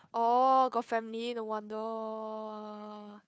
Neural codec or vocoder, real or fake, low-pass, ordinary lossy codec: codec, 16 kHz, 2 kbps, FunCodec, trained on Chinese and English, 25 frames a second; fake; none; none